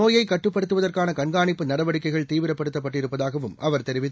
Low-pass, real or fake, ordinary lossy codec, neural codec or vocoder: none; real; none; none